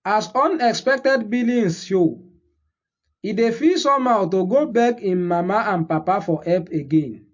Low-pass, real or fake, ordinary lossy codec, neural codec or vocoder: 7.2 kHz; real; MP3, 48 kbps; none